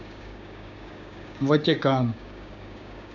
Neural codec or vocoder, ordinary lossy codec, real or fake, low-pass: codec, 16 kHz, 6 kbps, DAC; none; fake; 7.2 kHz